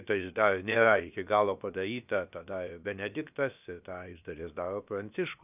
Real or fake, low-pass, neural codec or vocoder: fake; 3.6 kHz; codec, 16 kHz, about 1 kbps, DyCAST, with the encoder's durations